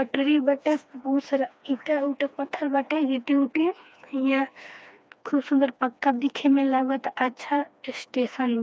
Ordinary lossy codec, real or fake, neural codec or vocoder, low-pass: none; fake; codec, 16 kHz, 2 kbps, FreqCodec, smaller model; none